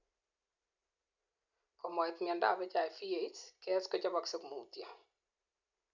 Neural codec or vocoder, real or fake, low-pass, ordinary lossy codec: none; real; 7.2 kHz; none